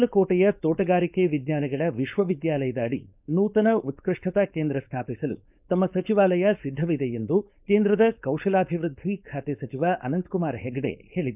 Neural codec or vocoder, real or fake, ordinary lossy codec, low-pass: codec, 16 kHz, 4.8 kbps, FACodec; fake; none; 3.6 kHz